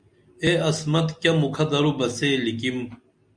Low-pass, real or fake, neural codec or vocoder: 9.9 kHz; real; none